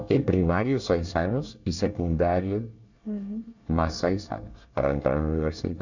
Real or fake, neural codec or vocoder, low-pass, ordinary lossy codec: fake; codec, 24 kHz, 1 kbps, SNAC; 7.2 kHz; none